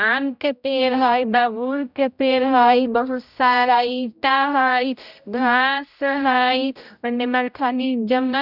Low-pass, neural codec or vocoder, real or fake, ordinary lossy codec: 5.4 kHz; codec, 16 kHz, 0.5 kbps, X-Codec, HuBERT features, trained on general audio; fake; none